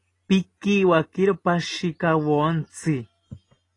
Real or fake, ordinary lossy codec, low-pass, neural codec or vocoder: real; AAC, 32 kbps; 10.8 kHz; none